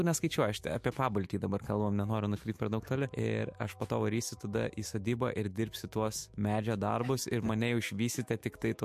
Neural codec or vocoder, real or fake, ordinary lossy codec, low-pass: autoencoder, 48 kHz, 128 numbers a frame, DAC-VAE, trained on Japanese speech; fake; MP3, 64 kbps; 14.4 kHz